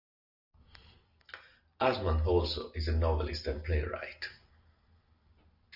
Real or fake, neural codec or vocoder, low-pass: real; none; 5.4 kHz